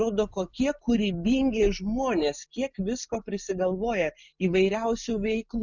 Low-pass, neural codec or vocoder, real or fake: 7.2 kHz; none; real